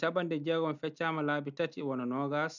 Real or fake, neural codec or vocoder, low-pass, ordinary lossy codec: real; none; 7.2 kHz; none